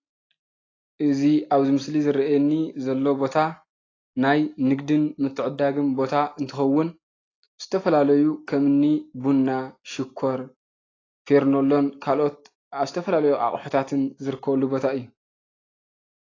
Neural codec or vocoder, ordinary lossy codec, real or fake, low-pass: none; AAC, 32 kbps; real; 7.2 kHz